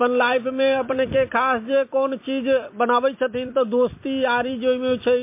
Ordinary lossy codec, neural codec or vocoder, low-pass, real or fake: MP3, 24 kbps; vocoder, 44.1 kHz, 128 mel bands every 256 samples, BigVGAN v2; 3.6 kHz; fake